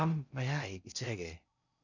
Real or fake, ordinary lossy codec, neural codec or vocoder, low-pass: fake; none; codec, 16 kHz in and 24 kHz out, 0.6 kbps, FocalCodec, streaming, 4096 codes; 7.2 kHz